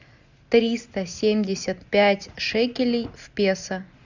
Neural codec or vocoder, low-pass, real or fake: none; 7.2 kHz; real